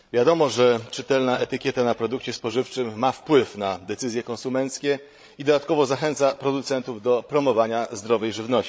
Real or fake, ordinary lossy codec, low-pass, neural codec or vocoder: fake; none; none; codec, 16 kHz, 16 kbps, FreqCodec, larger model